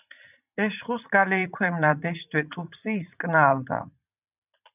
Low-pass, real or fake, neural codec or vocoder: 3.6 kHz; fake; vocoder, 44.1 kHz, 80 mel bands, Vocos